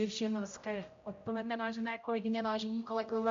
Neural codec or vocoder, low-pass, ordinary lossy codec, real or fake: codec, 16 kHz, 0.5 kbps, X-Codec, HuBERT features, trained on general audio; 7.2 kHz; MP3, 48 kbps; fake